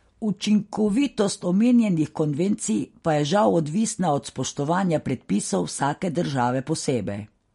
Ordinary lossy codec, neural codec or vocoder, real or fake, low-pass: MP3, 48 kbps; none; real; 10.8 kHz